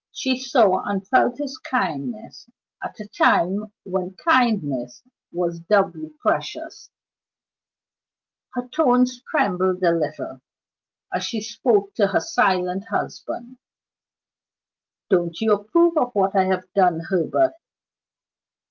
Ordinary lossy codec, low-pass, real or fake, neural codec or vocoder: Opus, 32 kbps; 7.2 kHz; real; none